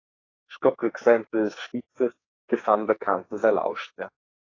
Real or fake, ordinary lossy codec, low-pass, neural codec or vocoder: fake; AAC, 32 kbps; 7.2 kHz; codec, 44.1 kHz, 2.6 kbps, SNAC